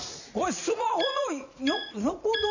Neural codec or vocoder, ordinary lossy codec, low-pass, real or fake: vocoder, 44.1 kHz, 80 mel bands, Vocos; none; 7.2 kHz; fake